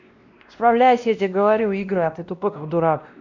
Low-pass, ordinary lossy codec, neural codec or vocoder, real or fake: 7.2 kHz; none; codec, 16 kHz, 1 kbps, X-Codec, WavLM features, trained on Multilingual LibriSpeech; fake